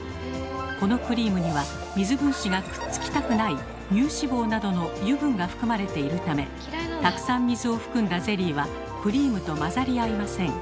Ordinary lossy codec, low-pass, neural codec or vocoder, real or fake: none; none; none; real